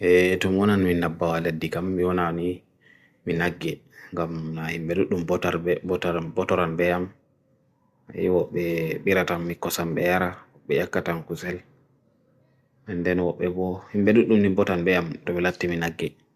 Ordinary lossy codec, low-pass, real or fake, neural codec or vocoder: Opus, 64 kbps; 14.4 kHz; real; none